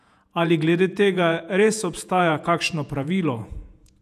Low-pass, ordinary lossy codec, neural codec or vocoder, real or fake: 14.4 kHz; none; vocoder, 48 kHz, 128 mel bands, Vocos; fake